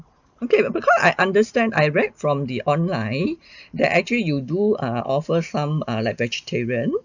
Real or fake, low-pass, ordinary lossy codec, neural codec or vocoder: real; 7.2 kHz; none; none